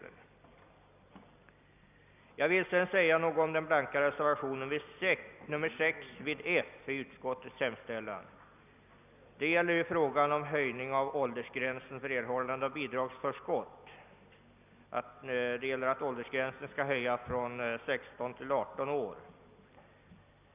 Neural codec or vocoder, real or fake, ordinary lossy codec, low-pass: none; real; none; 3.6 kHz